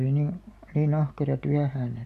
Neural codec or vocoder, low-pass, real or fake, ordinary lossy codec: none; 14.4 kHz; real; AAC, 96 kbps